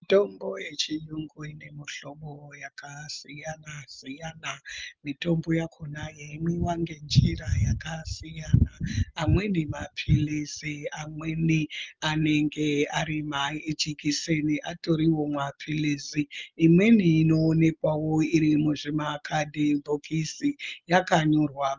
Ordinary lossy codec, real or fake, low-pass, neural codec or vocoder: Opus, 24 kbps; real; 7.2 kHz; none